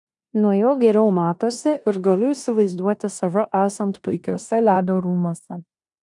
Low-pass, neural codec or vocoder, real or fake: 10.8 kHz; codec, 16 kHz in and 24 kHz out, 0.9 kbps, LongCat-Audio-Codec, four codebook decoder; fake